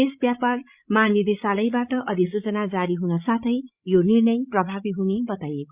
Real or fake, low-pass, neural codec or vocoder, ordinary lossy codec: fake; 3.6 kHz; codec, 16 kHz, 16 kbps, FreqCodec, larger model; Opus, 64 kbps